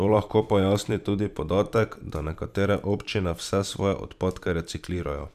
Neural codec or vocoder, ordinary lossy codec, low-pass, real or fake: vocoder, 44.1 kHz, 128 mel bands every 512 samples, BigVGAN v2; none; 14.4 kHz; fake